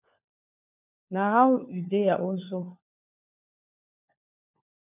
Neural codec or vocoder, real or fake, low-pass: codec, 16 kHz, 4 kbps, FunCodec, trained on LibriTTS, 50 frames a second; fake; 3.6 kHz